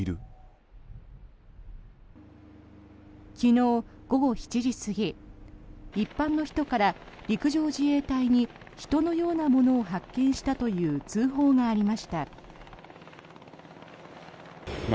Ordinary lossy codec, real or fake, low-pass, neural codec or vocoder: none; real; none; none